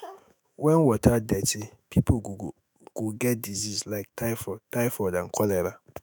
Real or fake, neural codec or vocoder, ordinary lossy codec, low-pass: fake; autoencoder, 48 kHz, 128 numbers a frame, DAC-VAE, trained on Japanese speech; none; none